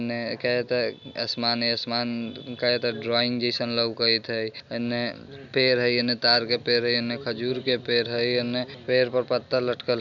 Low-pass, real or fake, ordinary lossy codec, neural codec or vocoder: 7.2 kHz; real; none; none